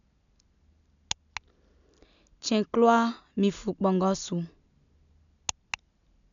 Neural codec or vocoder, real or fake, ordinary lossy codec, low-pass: none; real; none; 7.2 kHz